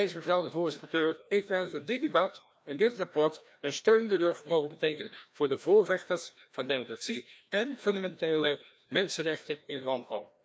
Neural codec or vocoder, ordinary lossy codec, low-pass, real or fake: codec, 16 kHz, 1 kbps, FreqCodec, larger model; none; none; fake